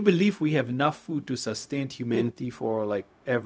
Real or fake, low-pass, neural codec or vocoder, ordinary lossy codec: fake; none; codec, 16 kHz, 0.4 kbps, LongCat-Audio-Codec; none